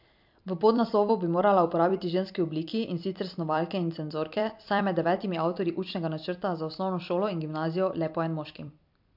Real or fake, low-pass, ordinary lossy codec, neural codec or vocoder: fake; 5.4 kHz; MP3, 48 kbps; vocoder, 44.1 kHz, 128 mel bands every 256 samples, BigVGAN v2